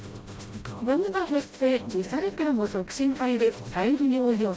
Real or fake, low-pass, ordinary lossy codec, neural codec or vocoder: fake; none; none; codec, 16 kHz, 0.5 kbps, FreqCodec, smaller model